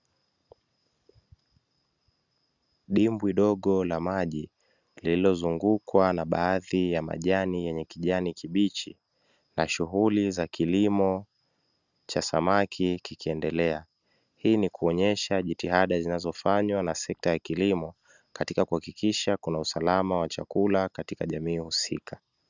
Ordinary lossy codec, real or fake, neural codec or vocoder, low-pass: Opus, 64 kbps; real; none; 7.2 kHz